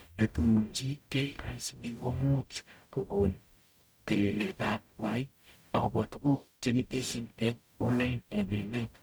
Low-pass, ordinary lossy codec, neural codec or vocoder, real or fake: none; none; codec, 44.1 kHz, 0.9 kbps, DAC; fake